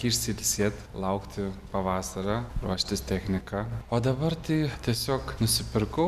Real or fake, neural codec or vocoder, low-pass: real; none; 14.4 kHz